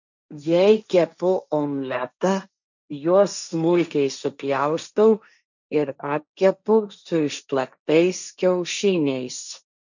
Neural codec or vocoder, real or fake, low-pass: codec, 16 kHz, 1.1 kbps, Voila-Tokenizer; fake; 7.2 kHz